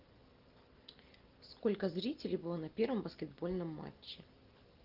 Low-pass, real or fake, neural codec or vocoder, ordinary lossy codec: 5.4 kHz; real; none; Opus, 32 kbps